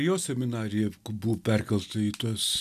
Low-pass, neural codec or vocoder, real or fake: 14.4 kHz; none; real